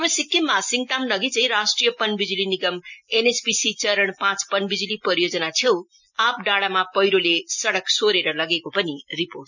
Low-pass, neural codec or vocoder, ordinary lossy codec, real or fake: 7.2 kHz; none; none; real